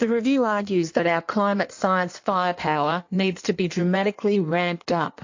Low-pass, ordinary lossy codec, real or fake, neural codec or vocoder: 7.2 kHz; AAC, 48 kbps; fake; codec, 16 kHz in and 24 kHz out, 1.1 kbps, FireRedTTS-2 codec